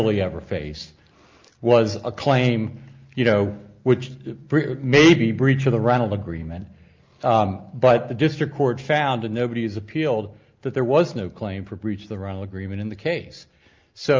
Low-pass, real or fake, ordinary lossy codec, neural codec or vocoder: 7.2 kHz; real; Opus, 24 kbps; none